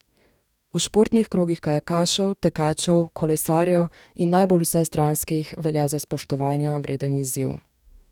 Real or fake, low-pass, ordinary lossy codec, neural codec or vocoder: fake; 19.8 kHz; none; codec, 44.1 kHz, 2.6 kbps, DAC